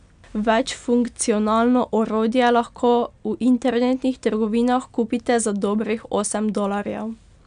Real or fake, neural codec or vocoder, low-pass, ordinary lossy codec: real; none; 9.9 kHz; none